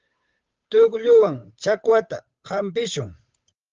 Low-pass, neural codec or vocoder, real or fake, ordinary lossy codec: 7.2 kHz; codec, 16 kHz, 8 kbps, FunCodec, trained on Chinese and English, 25 frames a second; fake; Opus, 32 kbps